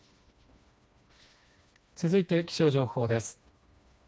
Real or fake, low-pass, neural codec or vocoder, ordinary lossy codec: fake; none; codec, 16 kHz, 2 kbps, FreqCodec, smaller model; none